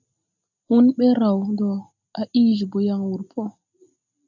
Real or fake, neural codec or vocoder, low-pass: real; none; 7.2 kHz